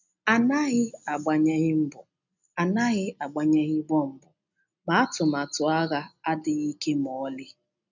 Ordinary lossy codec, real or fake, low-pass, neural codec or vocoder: none; real; 7.2 kHz; none